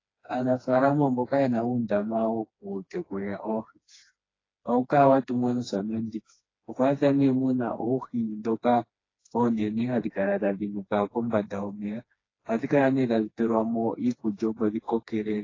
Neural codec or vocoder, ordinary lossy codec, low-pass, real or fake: codec, 16 kHz, 2 kbps, FreqCodec, smaller model; AAC, 32 kbps; 7.2 kHz; fake